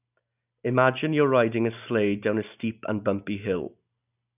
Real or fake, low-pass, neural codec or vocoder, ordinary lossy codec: real; 3.6 kHz; none; none